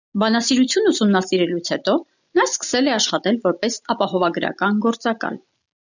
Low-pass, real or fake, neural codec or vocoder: 7.2 kHz; real; none